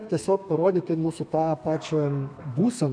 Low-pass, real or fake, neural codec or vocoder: 9.9 kHz; fake; codec, 32 kHz, 1.9 kbps, SNAC